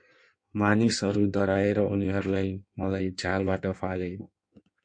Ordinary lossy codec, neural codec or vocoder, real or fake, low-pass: MP3, 48 kbps; codec, 16 kHz in and 24 kHz out, 1.1 kbps, FireRedTTS-2 codec; fake; 9.9 kHz